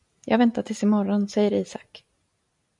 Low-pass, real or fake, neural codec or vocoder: 10.8 kHz; real; none